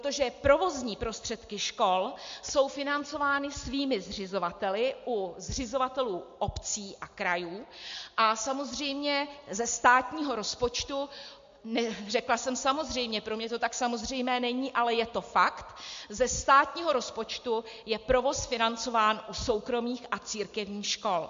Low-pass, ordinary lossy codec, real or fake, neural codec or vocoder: 7.2 kHz; MP3, 48 kbps; real; none